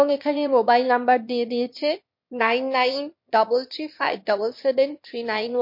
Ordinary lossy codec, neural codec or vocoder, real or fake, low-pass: MP3, 32 kbps; autoencoder, 22.05 kHz, a latent of 192 numbers a frame, VITS, trained on one speaker; fake; 5.4 kHz